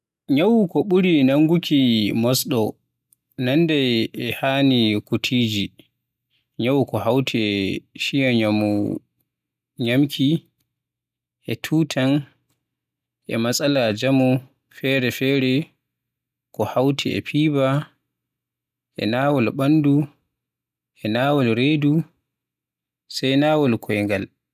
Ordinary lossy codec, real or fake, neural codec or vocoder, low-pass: none; real; none; 14.4 kHz